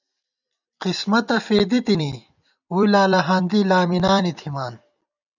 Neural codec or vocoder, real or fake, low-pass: vocoder, 44.1 kHz, 128 mel bands every 256 samples, BigVGAN v2; fake; 7.2 kHz